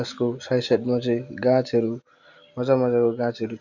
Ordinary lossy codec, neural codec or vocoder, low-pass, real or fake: none; none; 7.2 kHz; real